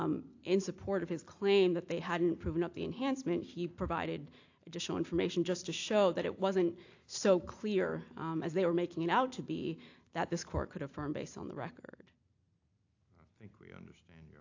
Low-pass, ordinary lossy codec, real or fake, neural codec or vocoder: 7.2 kHz; AAC, 48 kbps; real; none